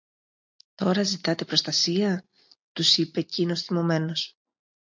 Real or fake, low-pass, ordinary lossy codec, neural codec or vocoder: real; 7.2 kHz; MP3, 64 kbps; none